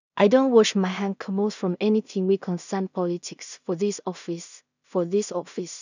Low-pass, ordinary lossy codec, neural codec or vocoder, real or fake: 7.2 kHz; none; codec, 16 kHz in and 24 kHz out, 0.4 kbps, LongCat-Audio-Codec, two codebook decoder; fake